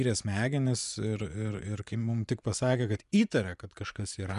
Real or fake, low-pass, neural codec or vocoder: fake; 10.8 kHz; vocoder, 24 kHz, 100 mel bands, Vocos